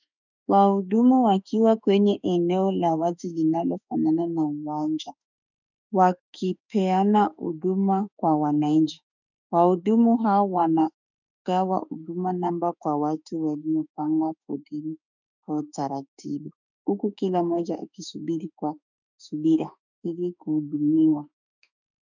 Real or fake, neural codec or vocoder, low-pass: fake; autoencoder, 48 kHz, 32 numbers a frame, DAC-VAE, trained on Japanese speech; 7.2 kHz